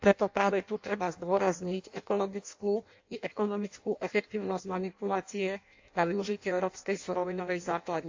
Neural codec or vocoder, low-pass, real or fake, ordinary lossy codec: codec, 16 kHz in and 24 kHz out, 0.6 kbps, FireRedTTS-2 codec; 7.2 kHz; fake; AAC, 48 kbps